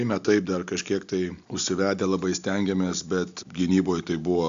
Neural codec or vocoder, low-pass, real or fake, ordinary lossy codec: none; 7.2 kHz; real; AAC, 64 kbps